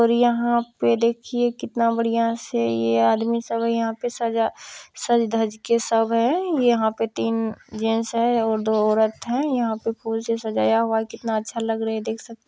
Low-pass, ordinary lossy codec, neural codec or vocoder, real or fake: none; none; none; real